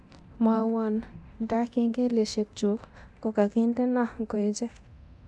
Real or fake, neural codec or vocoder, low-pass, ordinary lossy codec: fake; codec, 24 kHz, 0.9 kbps, DualCodec; none; none